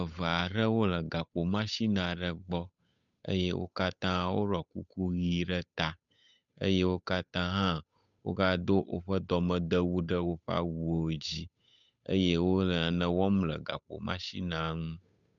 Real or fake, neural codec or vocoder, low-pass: fake; codec, 16 kHz, 8 kbps, FunCodec, trained on Chinese and English, 25 frames a second; 7.2 kHz